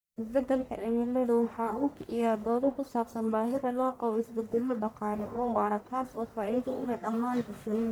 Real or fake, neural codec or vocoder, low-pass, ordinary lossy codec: fake; codec, 44.1 kHz, 1.7 kbps, Pupu-Codec; none; none